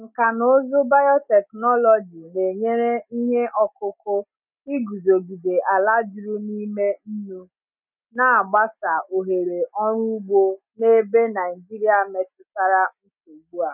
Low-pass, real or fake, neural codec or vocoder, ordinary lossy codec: 3.6 kHz; real; none; none